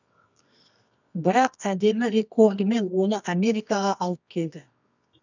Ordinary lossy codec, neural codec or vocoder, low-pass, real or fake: none; codec, 24 kHz, 0.9 kbps, WavTokenizer, medium music audio release; 7.2 kHz; fake